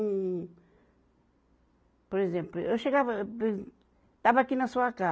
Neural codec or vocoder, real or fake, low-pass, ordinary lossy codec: none; real; none; none